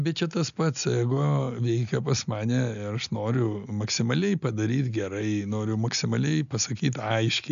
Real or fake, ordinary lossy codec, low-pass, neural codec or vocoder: real; AAC, 96 kbps; 7.2 kHz; none